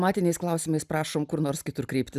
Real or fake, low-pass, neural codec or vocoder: real; 14.4 kHz; none